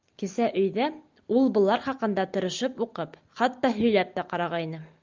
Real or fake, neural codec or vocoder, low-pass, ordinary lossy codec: real; none; 7.2 kHz; Opus, 24 kbps